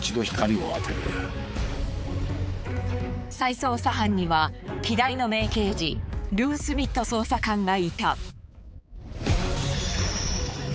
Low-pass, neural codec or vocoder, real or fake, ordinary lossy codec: none; codec, 16 kHz, 4 kbps, X-Codec, HuBERT features, trained on balanced general audio; fake; none